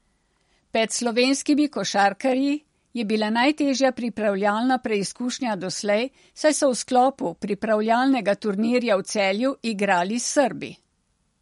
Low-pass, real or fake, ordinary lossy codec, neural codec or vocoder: 19.8 kHz; real; MP3, 48 kbps; none